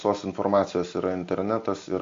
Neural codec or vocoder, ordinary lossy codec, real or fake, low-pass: none; AAC, 48 kbps; real; 7.2 kHz